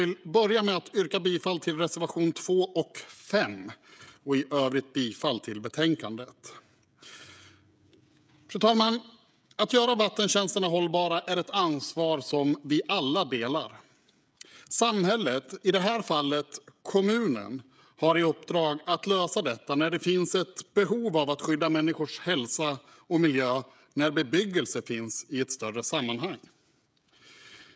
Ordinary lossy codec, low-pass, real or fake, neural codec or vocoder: none; none; fake; codec, 16 kHz, 16 kbps, FreqCodec, smaller model